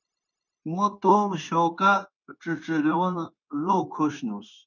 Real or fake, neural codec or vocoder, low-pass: fake; codec, 16 kHz, 0.9 kbps, LongCat-Audio-Codec; 7.2 kHz